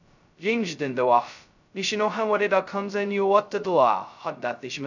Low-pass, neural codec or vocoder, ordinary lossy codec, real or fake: 7.2 kHz; codec, 16 kHz, 0.2 kbps, FocalCodec; none; fake